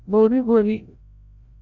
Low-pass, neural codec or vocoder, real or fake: 7.2 kHz; codec, 16 kHz, 0.5 kbps, FreqCodec, larger model; fake